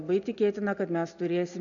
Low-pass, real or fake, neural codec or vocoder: 7.2 kHz; real; none